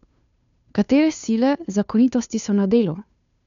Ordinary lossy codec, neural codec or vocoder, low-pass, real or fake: none; codec, 16 kHz, 2 kbps, FunCodec, trained on Chinese and English, 25 frames a second; 7.2 kHz; fake